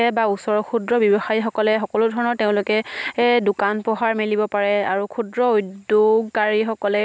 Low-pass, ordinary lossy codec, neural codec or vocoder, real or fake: none; none; none; real